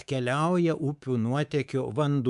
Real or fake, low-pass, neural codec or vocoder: fake; 10.8 kHz; codec, 24 kHz, 3.1 kbps, DualCodec